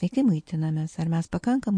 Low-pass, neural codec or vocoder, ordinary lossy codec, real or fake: 9.9 kHz; none; MP3, 48 kbps; real